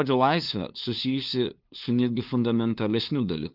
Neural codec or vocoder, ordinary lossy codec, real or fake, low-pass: codec, 16 kHz, 2 kbps, FunCodec, trained on LibriTTS, 25 frames a second; Opus, 32 kbps; fake; 5.4 kHz